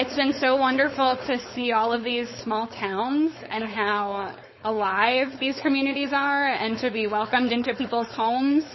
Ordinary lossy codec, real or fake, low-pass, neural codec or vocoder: MP3, 24 kbps; fake; 7.2 kHz; codec, 16 kHz, 4.8 kbps, FACodec